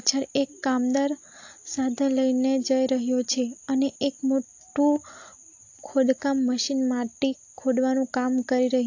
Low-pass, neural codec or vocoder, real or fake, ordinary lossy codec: 7.2 kHz; none; real; AAC, 48 kbps